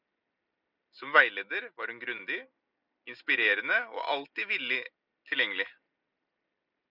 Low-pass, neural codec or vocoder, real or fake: 5.4 kHz; none; real